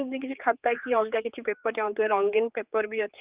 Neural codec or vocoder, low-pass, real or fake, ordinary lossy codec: codec, 16 kHz, 4 kbps, X-Codec, HuBERT features, trained on general audio; 3.6 kHz; fake; Opus, 24 kbps